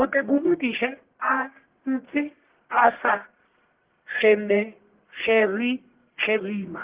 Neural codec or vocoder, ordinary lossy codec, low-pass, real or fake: codec, 44.1 kHz, 1.7 kbps, Pupu-Codec; Opus, 16 kbps; 3.6 kHz; fake